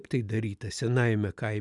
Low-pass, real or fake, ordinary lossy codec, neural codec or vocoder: 10.8 kHz; real; MP3, 96 kbps; none